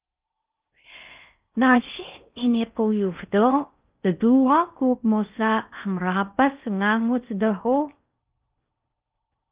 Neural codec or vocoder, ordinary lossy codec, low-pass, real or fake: codec, 16 kHz in and 24 kHz out, 0.6 kbps, FocalCodec, streaming, 4096 codes; Opus, 32 kbps; 3.6 kHz; fake